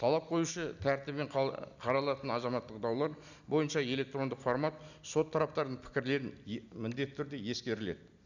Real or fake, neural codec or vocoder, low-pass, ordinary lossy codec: real; none; 7.2 kHz; none